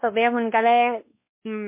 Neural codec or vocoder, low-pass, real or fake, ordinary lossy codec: codec, 16 kHz, 1 kbps, X-Codec, WavLM features, trained on Multilingual LibriSpeech; 3.6 kHz; fake; MP3, 24 kbps